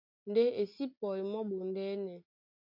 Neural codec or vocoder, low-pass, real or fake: none; 5.4 kHz; real